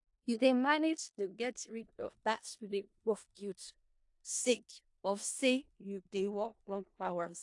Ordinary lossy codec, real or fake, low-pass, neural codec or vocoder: AAC, 64 kbps; fake; 10.8 kHz; codec, 16 kHz in and 24 kHz out, 0.4 kbps, LongCat-Audio-Codec, four codebook decoder